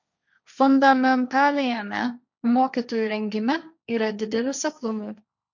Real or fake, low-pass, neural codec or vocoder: fake; 7.2 kHz; codec, 16 kHz, 1.1 kbps, Voila-Tokenizer